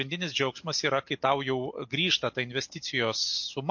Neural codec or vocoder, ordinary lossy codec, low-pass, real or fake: none; MP3, 48 kbps; 7.2 kHz; real